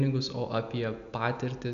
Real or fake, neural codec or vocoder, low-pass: real; none; 7.2 kHz